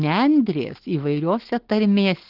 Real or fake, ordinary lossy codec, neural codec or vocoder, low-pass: real; Opus, 16 kbps; none; 5.4 kHz